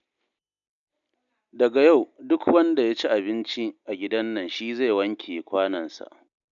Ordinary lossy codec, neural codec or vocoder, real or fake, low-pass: none; none; real; 7.2 kHz